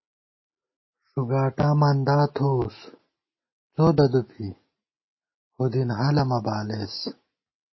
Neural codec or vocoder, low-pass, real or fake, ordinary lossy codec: none; 7.2 kHz; real; MP3, 24 kbps